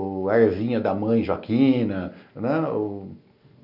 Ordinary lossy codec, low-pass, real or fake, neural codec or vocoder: none; 5.4 kHz; real; none